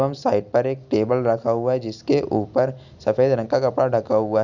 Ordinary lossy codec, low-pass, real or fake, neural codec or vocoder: none; 7.2 kHz; real; none